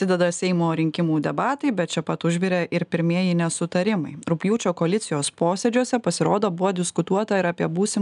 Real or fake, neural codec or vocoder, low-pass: real; none; 10.8 kHz